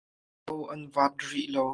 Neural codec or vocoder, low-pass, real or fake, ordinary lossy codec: none; 9.9 kHz; real; Opus, 24 kbps